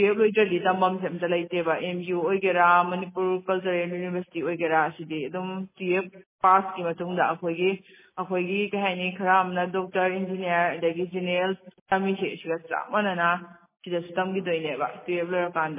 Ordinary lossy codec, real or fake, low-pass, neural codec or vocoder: MP3, 16 kbps; real; 3.6 kHz; none